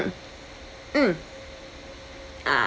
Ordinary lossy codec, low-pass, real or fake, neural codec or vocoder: none; none; real; none